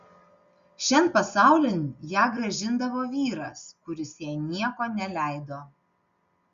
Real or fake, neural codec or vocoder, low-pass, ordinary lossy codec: real; none; 7.2 kHz; Opus, 64 kbps